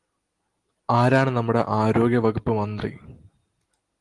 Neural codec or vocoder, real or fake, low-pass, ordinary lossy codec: none; real; 10.8 kHz; Opus, 24 kbps